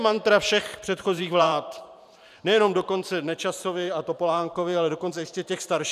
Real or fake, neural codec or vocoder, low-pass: fake; vocoder, 44.1 kHz, 128 mel bands every 512 samples, BigVGAN v2; 14.4 kHz